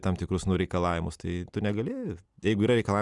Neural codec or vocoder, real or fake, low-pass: none; real; 10.8 kHz